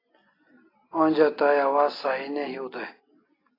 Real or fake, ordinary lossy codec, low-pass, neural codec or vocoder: real; AAC, 24 kbps; 5.4 kHz; none